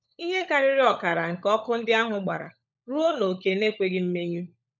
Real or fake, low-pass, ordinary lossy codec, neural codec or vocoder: fake; 7.2 kHz; none; codec, 16 kHz, 16 kbps, FunCodec, trained on LibriTTS, 50 frames a second